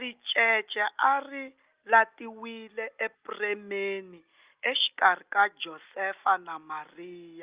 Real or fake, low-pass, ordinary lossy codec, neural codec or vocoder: real; 3.6 kHz; Opus, 32 kbps; none